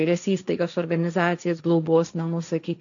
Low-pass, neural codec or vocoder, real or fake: 7.2 kHz; codec, 16 kHz, 1.1 kbps, Voila-Tokenizer; fake